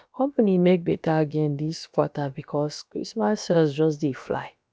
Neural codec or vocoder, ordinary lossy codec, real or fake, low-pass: codec, 16 kHz, about 1 kbps, DyCAST, with the encoder's durations; none; fake; none